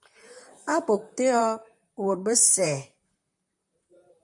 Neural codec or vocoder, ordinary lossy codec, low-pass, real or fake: vocoder, 44.1 kHz, 128 mel bands, Pupu-Vocoder; MP3, 64 kbps; 10.8 kHz; fake